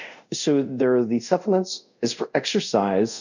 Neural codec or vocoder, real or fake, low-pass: codec, 24 kHz, 0.5 kbps, DualCodec; fake; 7.2 kHz